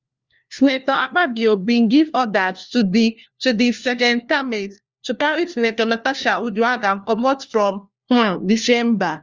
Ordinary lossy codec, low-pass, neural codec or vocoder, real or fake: Opus, 32 kbps; 7.2 kHz; codec, 16 kHz, 1 kbps, FunCodec, trained on LibriTTS, 50 frames a second; fake